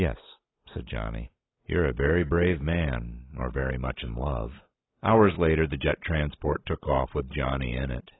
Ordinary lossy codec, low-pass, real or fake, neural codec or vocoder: AAC, 16 kbps; 7.2 kHz; real; none